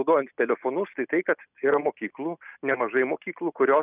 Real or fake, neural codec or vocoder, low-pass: real; none; 3.6 kHz